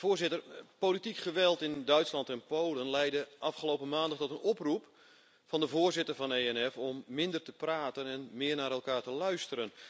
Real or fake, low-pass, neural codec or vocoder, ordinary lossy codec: real; none; none; none